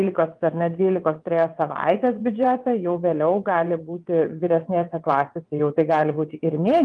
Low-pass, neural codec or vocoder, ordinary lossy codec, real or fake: 9.9 kHz; vocoder, 22.05 kHz, 80 mel bands, WaveNeXt; Opus, 32 kbps; fake